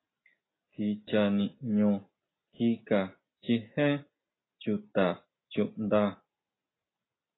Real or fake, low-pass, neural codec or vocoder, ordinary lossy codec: real; 7.2 kHz; none; AAC, 16 kbps